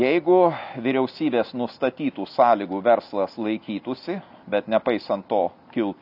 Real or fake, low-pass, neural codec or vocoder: real; 5.4 kHz; none